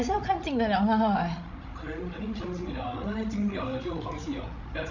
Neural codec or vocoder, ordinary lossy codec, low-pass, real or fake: codec, 16 kHz, 16 kbps, FreqCodec, larger model; none; 7.2 kHz; fake